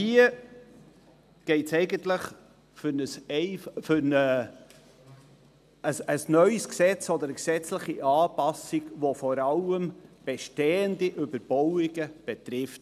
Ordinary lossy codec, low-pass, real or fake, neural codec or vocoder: none; 14.4 kHz; real; none